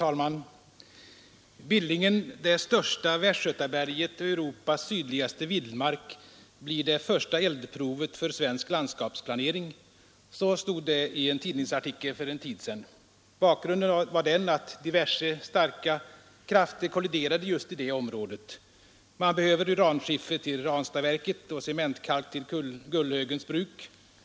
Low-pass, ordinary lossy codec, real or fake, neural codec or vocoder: none; none; real; none